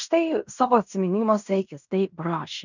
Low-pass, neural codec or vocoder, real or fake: 7.2 kHz; codec, 16 kHz in and 24 kHz out, 0.9 kbps, LongCat-Audio-Codec, fine tuned four codebook decoder; fake